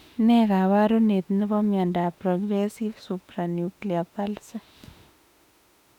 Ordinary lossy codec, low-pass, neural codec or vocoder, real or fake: none; 19.8 kHz; autoencoder, 48 kHz, 32 numbers a frame, DAC-VAE, trained on Japanese speech; fake